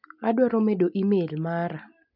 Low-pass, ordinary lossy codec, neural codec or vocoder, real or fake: 5.4 kHz; none; none; real